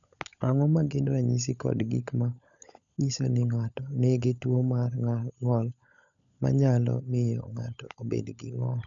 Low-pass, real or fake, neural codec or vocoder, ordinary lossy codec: 7.2 kHz; fake; codec, 16 kHz, 16 kbps, FunCodec, trained on LibriTTS, 50 frames a second; none